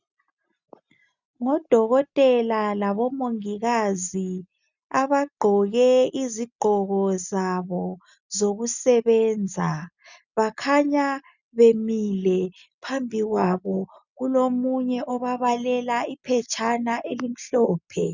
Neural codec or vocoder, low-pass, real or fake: none; 7.2 kHz; real